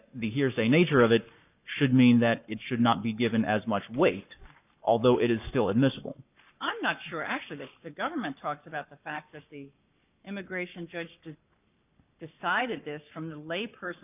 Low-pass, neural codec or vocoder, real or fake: 3.6 kHz; codec, 44.1 kHz, 7.8 kbps, Pupu-Codec; fake